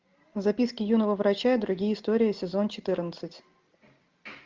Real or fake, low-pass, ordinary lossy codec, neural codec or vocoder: real; 7.2 kHz; Opus, 24 kbps; none